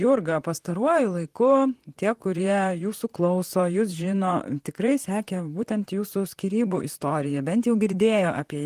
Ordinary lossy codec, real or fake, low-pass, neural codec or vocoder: Opus, 24 kbps; fake; 14.4 kHz; vocoder, 44.1 kHz, 128 mel bands, Pupu-Vocoder